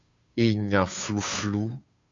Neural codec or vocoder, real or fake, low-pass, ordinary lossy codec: codec, 16 kHz, 2 kbps, FunCodec, trained on Chinese and English, 25 frames a second; fake; 7.2 kHz; AAC, 64 kbps